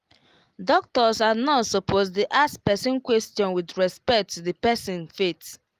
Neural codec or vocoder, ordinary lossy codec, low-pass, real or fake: none; Opus, 32 kbps; 14.4 kHz; real